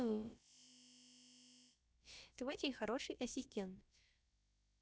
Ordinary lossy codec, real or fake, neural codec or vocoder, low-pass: none; fake; codec, 16 kHz, about 1 kbps, DyCAST, with the encoder's durations; none